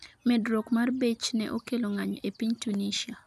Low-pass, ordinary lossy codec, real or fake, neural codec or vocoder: 14.4 kHz; none; real; none